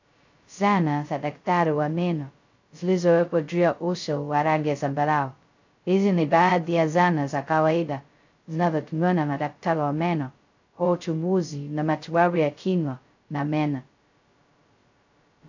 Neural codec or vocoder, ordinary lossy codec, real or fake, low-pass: codec, 16 kHz, 0.2 kbps, FocalCodec; AAC, 48 kbps; fake; 7.2 kHz